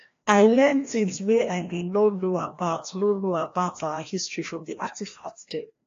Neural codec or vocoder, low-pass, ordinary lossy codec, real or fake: codec, 16 kHz, 1 kbps, FreqCodec, larger model; 7.2 kHz; AAC, 48 kbps; fake